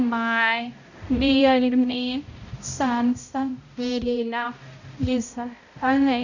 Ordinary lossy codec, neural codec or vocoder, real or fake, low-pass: none; codec, 16 kHz, 0.5 kbps, X-Codec, HuBERT features, trained on balanced general audio; fake; 7.2 kHz